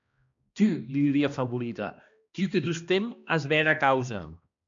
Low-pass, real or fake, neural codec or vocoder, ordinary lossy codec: 7.2 kHz; fake; codec, 16 kHz, 1 kbps, X-Codec, HuBERT features, trained on balanced general audio; MP3, 64 kbps